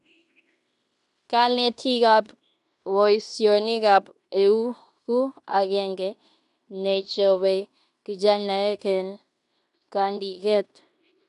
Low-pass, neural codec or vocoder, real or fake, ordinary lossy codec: 10.8 kHz; codec, 16 kHz in and 24 kHz out, 0.9 kbps, LongCat-Audio-Codec, fine tuned four codebook decoder; fake; none